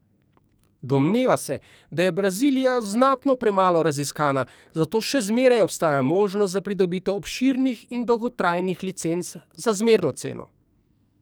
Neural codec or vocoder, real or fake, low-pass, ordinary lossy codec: codec, 44.1 kHz, 2.6 kbps, SNAC; fake; none; none